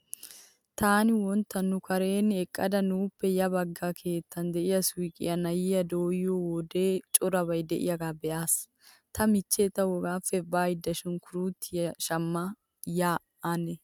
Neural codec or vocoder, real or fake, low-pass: none; real; 19.8 kHz